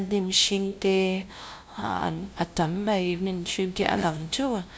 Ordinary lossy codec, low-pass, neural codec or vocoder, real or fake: none; none; codec, 16 kHz, 0.5 kbps, FunCodec, trained on LibriTTS, 25 frames a second; fake